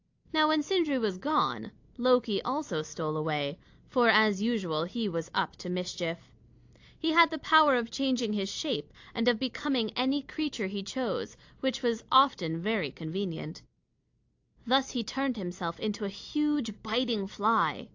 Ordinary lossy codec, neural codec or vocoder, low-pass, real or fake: AAC, 48 kbps; none; 7.2 kHz; real